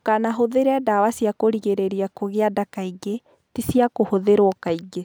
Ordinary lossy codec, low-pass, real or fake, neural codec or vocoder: none; none; real; none